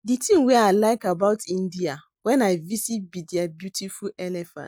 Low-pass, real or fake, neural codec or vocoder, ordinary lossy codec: none; real; none; none